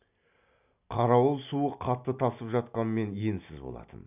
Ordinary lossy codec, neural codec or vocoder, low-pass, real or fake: none; none; 3.6 kHz; real